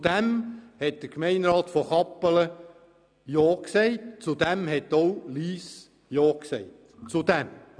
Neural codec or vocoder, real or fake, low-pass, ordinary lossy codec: none; real; 9.9 kHz; none